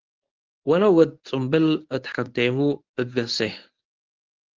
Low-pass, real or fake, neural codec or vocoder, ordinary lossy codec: 7.2 kHz; fake; codec, 24 kHz, 0.9 kbps, WavTokenizer, medium speech release version 1; Opus, 32 kbps